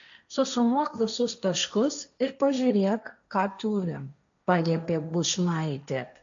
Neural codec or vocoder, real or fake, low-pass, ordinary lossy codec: codec, 16 kHz, 1.1 kbps, Voila-Tokenizer; fake; 7.2 kHz; MP3, 64 kbps